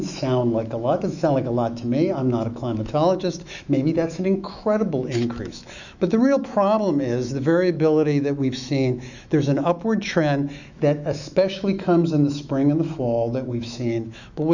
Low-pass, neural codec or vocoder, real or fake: 7.2 kHz; autoencoder, 48 kHz, 128 numbers a frame, DAC-VAE, trained on Japanese speech; fake